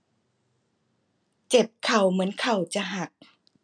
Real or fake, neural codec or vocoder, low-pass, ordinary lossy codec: real; none; 9.9 kHz; none